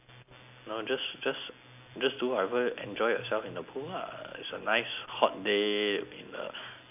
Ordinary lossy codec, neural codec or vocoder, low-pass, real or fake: none; none; 3.6 kHz; real